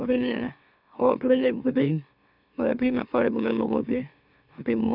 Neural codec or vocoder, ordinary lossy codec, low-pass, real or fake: autoencoder, 44.1 kHz, a latent of 192 numbers a frame, MeloTTS; none; 5.4 kHz; fake